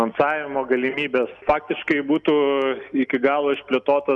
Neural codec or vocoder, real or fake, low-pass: none; real; 10.8 kHz